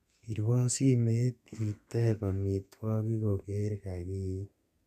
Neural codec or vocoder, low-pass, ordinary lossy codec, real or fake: codec, 32 kHz, 1.9 kbps, SNAC; 14.4 kHz; none; fake